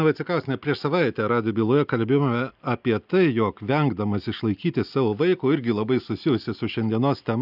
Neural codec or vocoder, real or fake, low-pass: none; real; 5.4 kHz